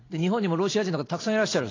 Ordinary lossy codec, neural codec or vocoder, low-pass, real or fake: AAC, 32 kbps; none; 7.2 kHz; real